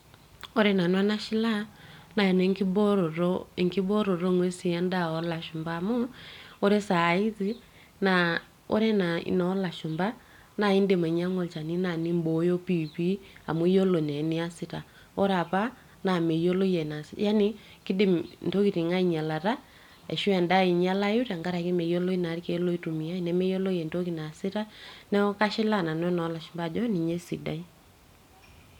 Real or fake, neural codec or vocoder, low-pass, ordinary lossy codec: real; none; 19.8 kHz; none